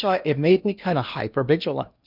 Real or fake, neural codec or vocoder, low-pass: fake; codec, 16 kHz in and 24 kHz out, 0.8 kbps, FocalCodec, streaming, 65536 codes; 5.4 kHz